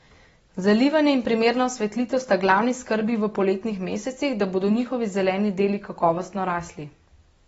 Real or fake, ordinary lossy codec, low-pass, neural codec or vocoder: real; AAC, 24 kbps; 19.8 kHz; none